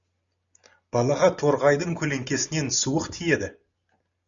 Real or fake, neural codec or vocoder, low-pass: real; none; 7.2 kHz